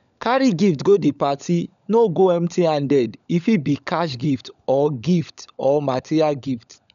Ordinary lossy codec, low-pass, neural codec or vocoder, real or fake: none; 7.2 kHz; codec, 16 kHz, 16 kbps, FunCodec, trained on LibriTTS, 50 frames a second; fake